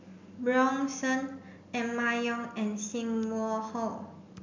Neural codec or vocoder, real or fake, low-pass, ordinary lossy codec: none; real; 7.2 kHz; MP3, 64 kbps